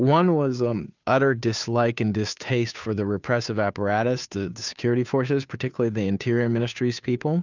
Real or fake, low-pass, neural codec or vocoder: fake; 7.2 kHz; codec, 16 kHz, 2 kbps, FunCodec, trained on Chinese and English, 25 frames a second